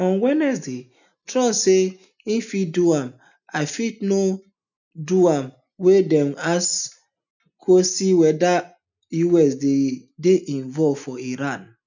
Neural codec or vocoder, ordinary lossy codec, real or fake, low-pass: none; none; real; 7.2 kHz